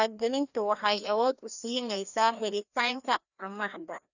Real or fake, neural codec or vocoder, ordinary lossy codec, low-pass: fake; codec, 16 kHz, 1 kbps, FreqCodec, larger model; none; 7.2 kHz